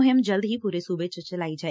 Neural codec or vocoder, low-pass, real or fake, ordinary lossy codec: none; none; real; none